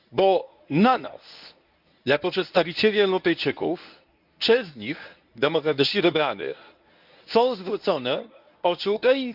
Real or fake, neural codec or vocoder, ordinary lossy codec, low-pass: fake; codec, 24 kHz, 0.9 kbps, WavTokenizer, medium speech release version 1; none; 5.4 kHz